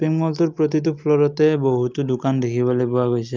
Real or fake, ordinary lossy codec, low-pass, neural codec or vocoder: real; Opus, 32 kbps; 7.2 kHz; none